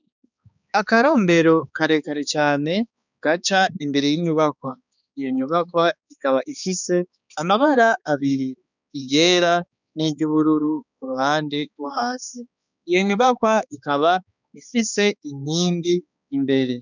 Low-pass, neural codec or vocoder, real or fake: 7.2 kHz; codec, 16 kHz, 2 kbps, X-Codec, HuBERT features, trained on balanced general audio; fake